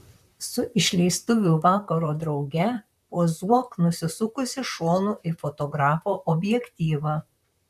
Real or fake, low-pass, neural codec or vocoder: fake; 14.4 kHz; vocoder, 44.1 kHz, 128 mel bands, Pupu-Vocoder